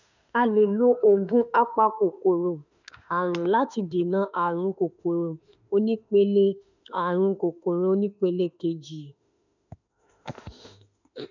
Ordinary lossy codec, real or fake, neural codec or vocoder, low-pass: none; fake; autoencoder, 48 kHz, 32 numbers a frame, DAC-VAE, trained on Japanese speech; 7.2 kHz